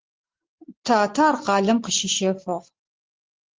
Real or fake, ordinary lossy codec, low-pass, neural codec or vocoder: real; Opus, 16 kbps; 7.2 kHz; none